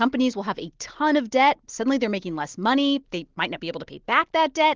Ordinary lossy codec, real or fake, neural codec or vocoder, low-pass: Opus, 16 kbps; real; none; 7.2 kHz